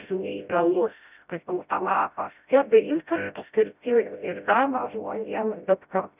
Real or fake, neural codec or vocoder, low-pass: fake; codec, 16 kHz, 0.5 kbps, FreqCodec, smaller model; 3.6 kHz